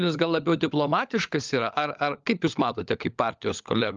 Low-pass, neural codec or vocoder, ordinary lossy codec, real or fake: 7.2 kHz; codec, 16 kHz, 16 kbps, FunCodec, trained on LibriTTS, 50 frames a second; Opus, 24 kbps; fake